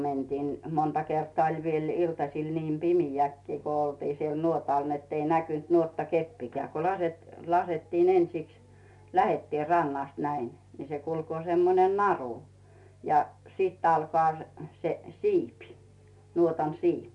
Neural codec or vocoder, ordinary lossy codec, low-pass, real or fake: none; none; 10.8 kHz; real